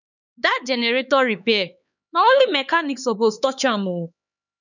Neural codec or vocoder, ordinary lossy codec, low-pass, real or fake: codec, 16 kHz, 4 kbps, X-Codec, HuBERT features, trained on LibriSpeech; none; 7.2 kHz; fake